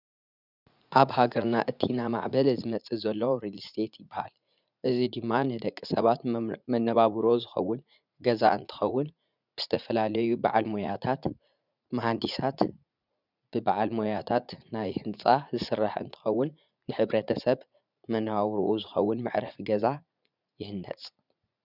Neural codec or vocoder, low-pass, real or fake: vocoder, 44.1 kHz, 80 mel bands, Vocos; 5.4 kHz; fake